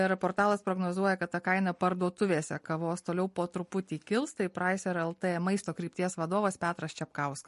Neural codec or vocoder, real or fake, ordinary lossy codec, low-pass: none; real; MP3, 48 kbps; 14.4 kHz